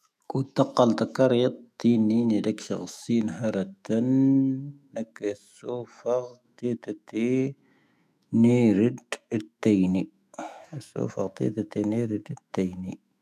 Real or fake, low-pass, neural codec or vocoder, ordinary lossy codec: fake; 14.4 kHz; autoencoder, 48 kHz, 128 numbers a frame, DAC-VAE, trained on Japanese speech; none